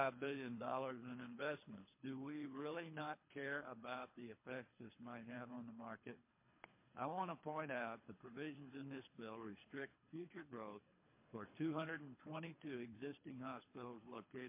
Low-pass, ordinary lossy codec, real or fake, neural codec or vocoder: 3.6 kHz; MP3, 24 kbps; fake; codec, 24 kHz, 3 kbps, HILCodec